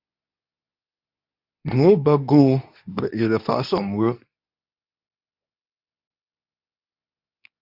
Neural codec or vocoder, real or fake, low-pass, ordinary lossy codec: codec, 24 kHz, 0.9 kbps, WavTokenizer, medium speech release version 2; fake; 5.4 kHz; AAC, 48 kbps